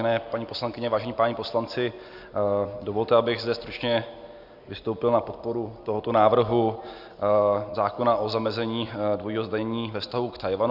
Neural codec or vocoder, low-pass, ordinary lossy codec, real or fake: none; 5.4 kHz; Opus, 64 kbps; real